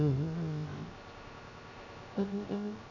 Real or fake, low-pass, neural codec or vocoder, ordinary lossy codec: fake; 7.2 kHz; codec, 16 kHz, 0.3 kbps, FocalCodec; none